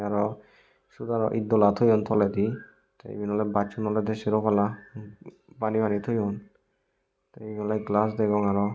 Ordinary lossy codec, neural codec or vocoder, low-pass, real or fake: none; none; none; real